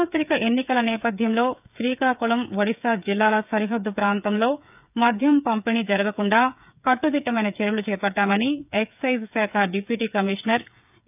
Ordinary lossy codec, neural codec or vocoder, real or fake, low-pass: none; codec, 16 kHz, 8 kbps, FreqCodec, smaller model; fake; 3.6 kHz